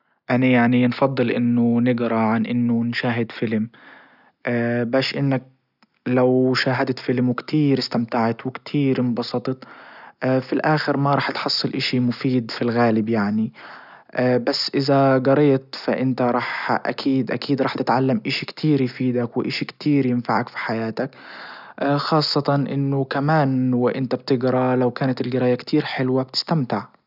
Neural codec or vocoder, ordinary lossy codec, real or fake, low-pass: none; none; real; 5.4 kHz